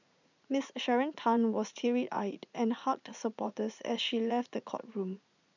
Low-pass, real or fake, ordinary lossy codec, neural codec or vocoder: 7.2 kHz; fake; none; vocoder, 44.1 kHz, 80 mel bands, Vocos